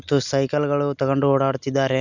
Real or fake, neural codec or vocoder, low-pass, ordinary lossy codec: real; none; 7.2 kHz; none